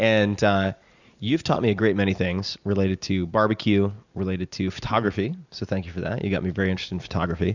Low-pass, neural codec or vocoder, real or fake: 7.2 kHz; none; real